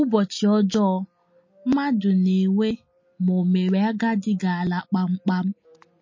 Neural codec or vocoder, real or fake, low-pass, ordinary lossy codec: none; real; 7.2 kHz; MP3, 32 kbps